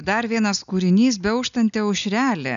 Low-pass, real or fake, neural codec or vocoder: 7.2 kHz; real; none